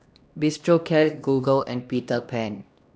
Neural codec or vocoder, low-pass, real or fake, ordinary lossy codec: codec, 16 kHz, 1 kbps, X-Codec, HuBERT features, trained on LibriSpeech; none; fake; none